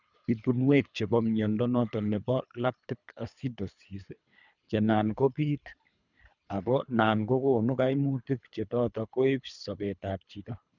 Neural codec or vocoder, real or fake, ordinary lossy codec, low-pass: codec, 24 kHz, 3 kbps, HILCodec; fake; none; 7.2 kHz